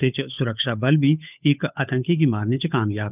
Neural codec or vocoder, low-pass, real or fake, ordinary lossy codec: codec, 16 kHz, 8 kbps, FunCodec, trained on Chinese and English, 25 frames a second; 3.6 kHz; fake; none